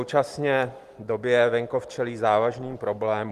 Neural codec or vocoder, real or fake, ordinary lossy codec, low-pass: none; real; Opus, 24 kbps; 14.4 kHz